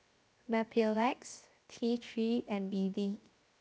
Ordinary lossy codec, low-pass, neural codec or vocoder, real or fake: none; none; codec, 16 kHz, 0.7 kbps, FocalCodec; fake